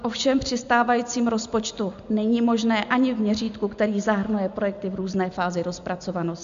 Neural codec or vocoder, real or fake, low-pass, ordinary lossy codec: none; real; 7.2 kHz; MP3, 64 kbps